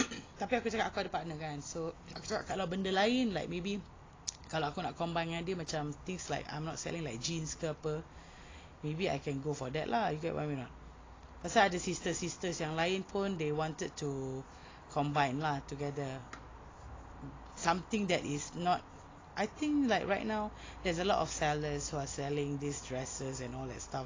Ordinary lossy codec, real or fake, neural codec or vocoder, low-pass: AAC, 32 kbps; real; none; 7.2 kHz